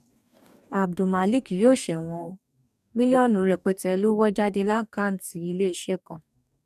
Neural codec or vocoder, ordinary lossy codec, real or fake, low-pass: codec, 44.1 kHz, 2.6 kbps, DAC; none; fake; 14.4 kHz